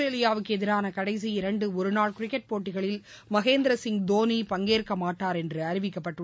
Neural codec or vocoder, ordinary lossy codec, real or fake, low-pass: none; none; real; none